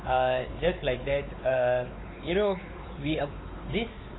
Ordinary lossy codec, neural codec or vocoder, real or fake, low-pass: AAC, 16 kbps; codec, 16 kHz, 4 kbps, X-Codec, WavLM features, trained on Multilingual LibriSpeech; fake; 7.2 kHz